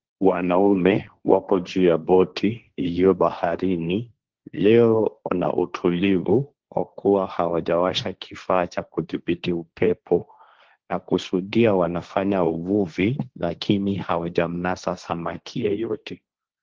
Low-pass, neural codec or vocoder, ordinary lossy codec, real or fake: 7.2 kHz; codec, 16 kHz, 1.1 kbps, Voila-Tokenizer; Opus, 16 kbps; fake